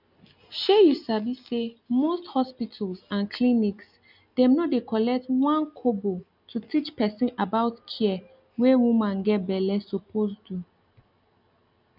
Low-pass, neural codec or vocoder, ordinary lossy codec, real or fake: 5.4 kHz; none; none; real